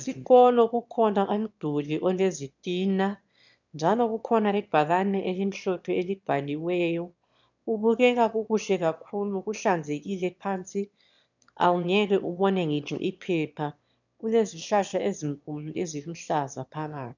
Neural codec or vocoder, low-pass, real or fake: autoencoder, 22.05 kHz, a latent of 192 numbers a frame, VITS, trained on one speaker; 7.2 kHz; fake